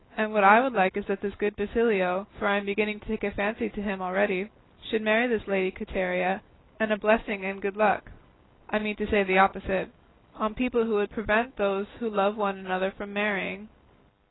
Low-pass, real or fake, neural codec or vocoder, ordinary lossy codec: 7.2 kHz; real; none; AAC, 16 kbps